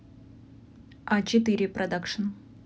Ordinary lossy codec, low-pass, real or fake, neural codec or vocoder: none; none; real; none